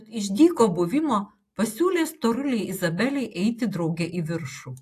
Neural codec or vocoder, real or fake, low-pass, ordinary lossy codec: none; real; 14.4 kHz; AAC, 64 kbps